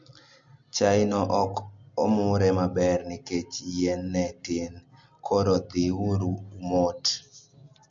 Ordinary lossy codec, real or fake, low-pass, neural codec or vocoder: MP3, 48 kbps; real; 7.2 kHz; none